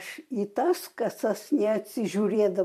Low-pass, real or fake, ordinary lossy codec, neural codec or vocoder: 14.4 kHz; fake; MP3, 64 kbps; vocoder, 44.1 kHz, 128 mel bands every 256 samples, BigVGAN v2